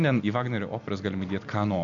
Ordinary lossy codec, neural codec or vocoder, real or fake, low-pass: MP3, 64 kbps; none; real; 7.2 kHz